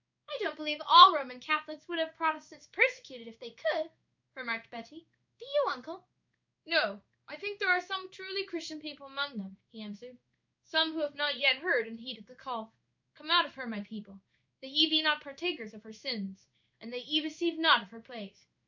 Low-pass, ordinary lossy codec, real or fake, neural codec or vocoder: 7.2 kHz; MP3, 64 kbps; fake; codec, 16 kHz in and 24 kHz out, 1 kbps, XY-Tokenizer